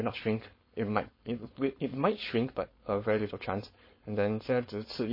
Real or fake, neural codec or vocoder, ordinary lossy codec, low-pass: fake; codec, 16 kHz, 4.8 kbps, FACodec; MP3, 24 kbps; 5.4 kHz